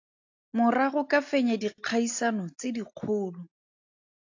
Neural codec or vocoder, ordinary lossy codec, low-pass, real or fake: none; AAC, 48 kbps; 7.2 kHz; real